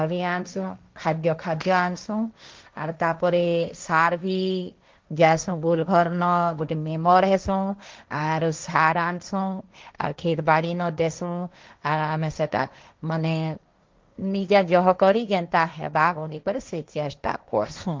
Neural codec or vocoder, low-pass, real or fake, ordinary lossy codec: codec, 16 kHz, 1.1 kbps, Voila-Tokenizer; 7.2 kHz; fake; Opus, 24 kbps